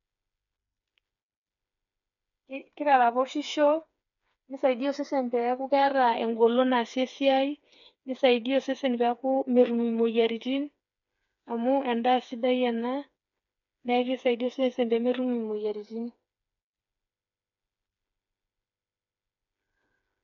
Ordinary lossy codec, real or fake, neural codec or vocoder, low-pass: none; fake; codec, 16 kHz, 4 kbps, FreqCodec, smaller model; 7.2 kHz